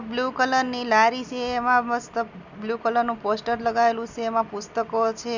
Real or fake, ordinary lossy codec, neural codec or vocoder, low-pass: real; none; none; 7.2 kHz